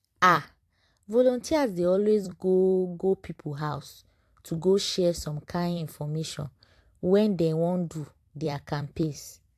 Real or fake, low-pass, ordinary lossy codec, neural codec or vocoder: fake; 14.4 kHz; AAC, 64 kbps; vocoder, 44.1 kHz, 128 mel bands every 256 samples, BigVGAN v2